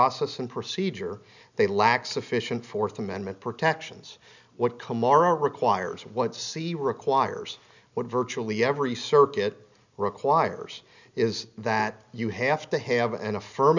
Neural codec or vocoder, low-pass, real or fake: none; 7.2 kHz; real